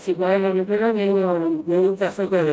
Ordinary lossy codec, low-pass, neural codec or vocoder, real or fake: none; none; codec, 16 kHz, 0.5 kbps, FreqCodec, smaller model; fake